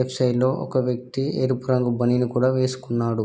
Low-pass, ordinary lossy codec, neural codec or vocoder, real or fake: none; none; none; real